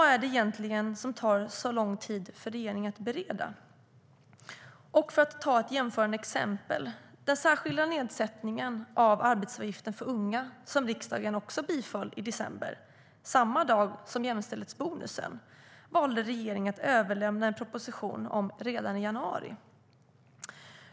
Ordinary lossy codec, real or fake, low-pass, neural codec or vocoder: none; real; none; none